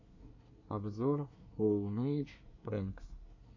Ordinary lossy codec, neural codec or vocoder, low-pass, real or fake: AAC, 48 kbps; codec, 24 kHz, 1 kbps, SNAC; 7.2 kHz; fake